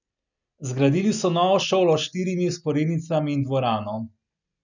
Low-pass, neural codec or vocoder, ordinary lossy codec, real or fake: 7.2 kHz; none; none; real